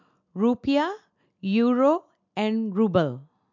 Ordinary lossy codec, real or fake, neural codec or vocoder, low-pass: MP3, 64 kbps; real; none; 7.2 kHz